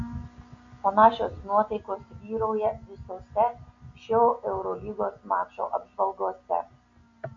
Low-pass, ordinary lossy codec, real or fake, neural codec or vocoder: 7.2 kHz; AAC, 48 kbps; real; none